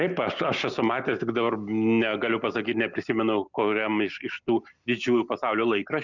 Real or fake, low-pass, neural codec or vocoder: real; 7.2 kHz; none